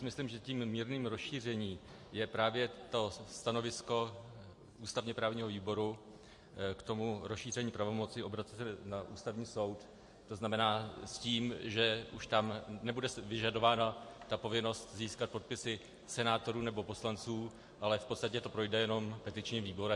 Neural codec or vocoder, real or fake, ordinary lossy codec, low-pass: none; real; MP3, 48 kbps; 10.8 kHz